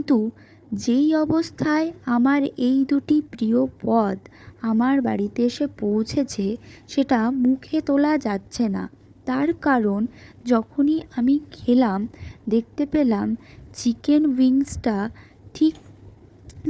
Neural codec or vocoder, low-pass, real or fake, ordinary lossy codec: codec, 16 kHz, 4 kbps, FunCodec, trained on Chinese and English, 50 frames a second; none; fake; none